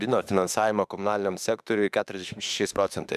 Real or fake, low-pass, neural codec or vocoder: fake; 14.4 kHz; autoencoder, 48 kHz, 32 numbers a frame, DAC-VAE, trained on Japanese speech